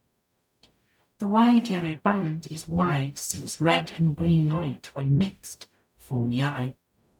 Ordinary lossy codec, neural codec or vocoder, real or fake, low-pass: none; codec, 44.1 kHz, 0.9 kbps, DAC; fake; 19.8 kHz